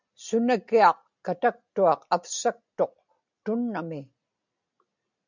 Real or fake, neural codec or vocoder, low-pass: real; none; 7.2 kHz